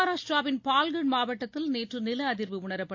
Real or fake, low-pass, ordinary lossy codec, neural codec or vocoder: real; 7.2 kHz; AAC, 48 kbps; none